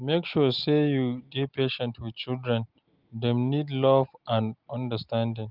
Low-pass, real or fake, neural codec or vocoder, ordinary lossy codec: 5.4 kHz; real; none; Opus, 32 kbps